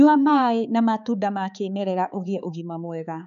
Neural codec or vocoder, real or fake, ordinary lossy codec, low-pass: codec, 16 kHz, 4 kbps, X-Codec, HuBERT features, trained on balanced general audio; fake; none; 7.2 kHz